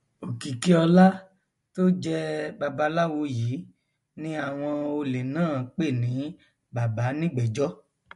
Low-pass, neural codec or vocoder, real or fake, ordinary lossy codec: 14.4 kHz; none; real; MP3, 48 kbps